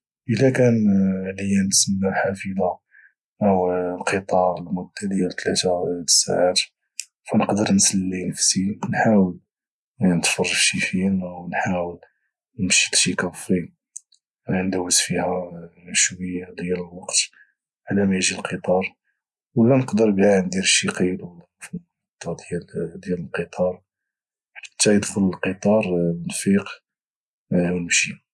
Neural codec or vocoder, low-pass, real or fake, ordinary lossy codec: none; none; real; none